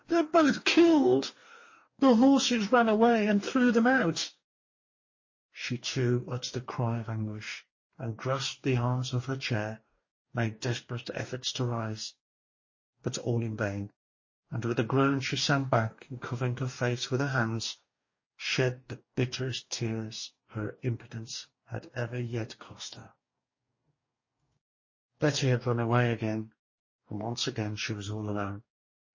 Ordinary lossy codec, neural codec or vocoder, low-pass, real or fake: MP3, 32 kbps; codec, 44.1 kHz, 2.6 kbps, DAC; 7.2 kHz; fake